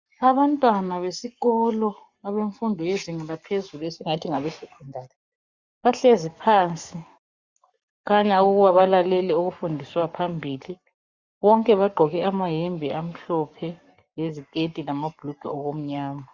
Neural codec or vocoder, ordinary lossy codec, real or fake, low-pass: codec, 44.1 kHz, 7.8 kbps, Pupu-Codec; Opus, 64 kbps; fake; 7.2 kHz